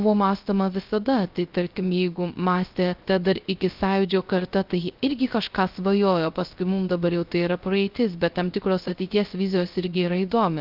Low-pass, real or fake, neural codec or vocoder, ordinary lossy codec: 5.4 kHz; fake; codec, 16 kHz, 0.3 kbps, FocalCodec; Opus, 24 kbps